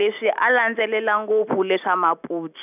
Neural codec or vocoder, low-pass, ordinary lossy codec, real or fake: none; 3.6 kHz; none; real